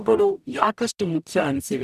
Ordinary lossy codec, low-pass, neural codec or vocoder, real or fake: none; 14.4 kHz; codec, 44.1 kHz, 0.9 kbps, DAC; fake